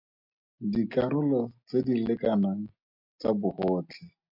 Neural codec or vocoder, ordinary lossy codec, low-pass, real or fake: none; MP3, 48 kbps; 5.4 kHz; real